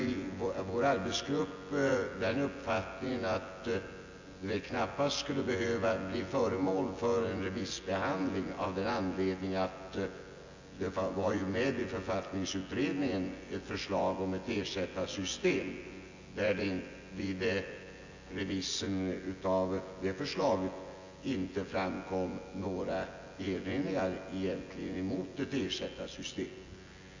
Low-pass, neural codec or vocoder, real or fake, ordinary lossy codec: 7.2 kHz; vocoder, 24 kHz, 100 mel bands, Vocos; fake; AAC, 48 kbps